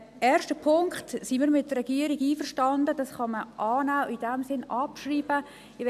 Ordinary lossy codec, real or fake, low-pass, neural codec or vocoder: AAC, 96 kbps; real; 14.4 kHz; none